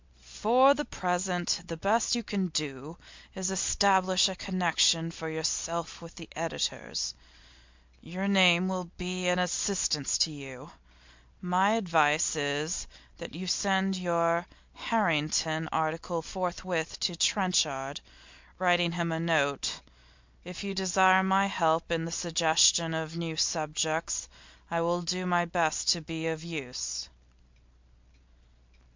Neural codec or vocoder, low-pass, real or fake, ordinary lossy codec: none; 7.2 kHz; real; MP3, 64 kbps